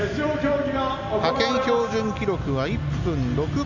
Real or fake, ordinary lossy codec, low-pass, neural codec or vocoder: real; none; 7.2 kHz; none